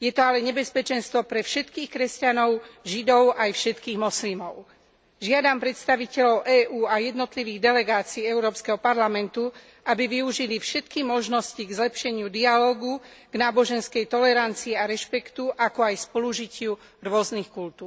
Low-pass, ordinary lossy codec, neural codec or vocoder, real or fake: none; none; none; real